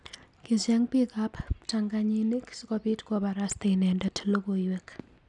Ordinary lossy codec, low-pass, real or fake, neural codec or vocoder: none; 10.8 kHz; real; none